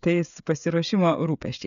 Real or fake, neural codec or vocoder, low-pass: fake; codec, 16 kHz, 16 kbps, FreqCodec, smaller model; 7.2 kHz